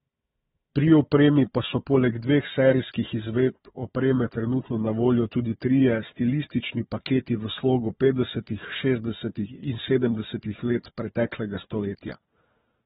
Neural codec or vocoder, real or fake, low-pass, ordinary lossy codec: codec, 16 kHz, 4 kbps, FunCodec, trained on Chinese and English, 50 frames a second; fake; 7.2 kHz; AAC, 16 kbps